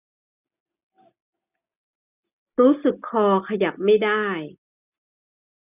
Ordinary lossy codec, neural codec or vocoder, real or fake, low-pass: none; none; real; 3.6 kHz